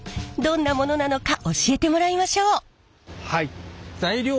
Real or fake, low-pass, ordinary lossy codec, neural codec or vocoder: real; none; none; none